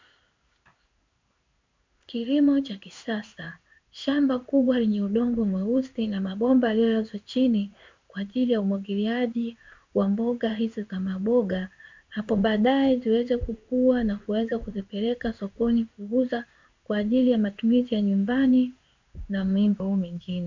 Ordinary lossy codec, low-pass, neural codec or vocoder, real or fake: MP3, 64 kbps; 7.2 kHz; codec, 16 kHz in and 24 kHz out, 1 kbps, XY-Tokenizer; fake